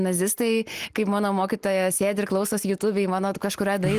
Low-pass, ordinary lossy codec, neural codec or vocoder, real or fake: 14.4 kHz; Opus, 24 kbps; none; real